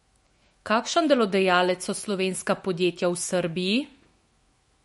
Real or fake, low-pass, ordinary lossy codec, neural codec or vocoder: fake; 19.8 kHz; MP3, 48 kbps; autoencoder, 48 kHz, 128 numbers a frame, DAC-VAE, trained on Japanese speech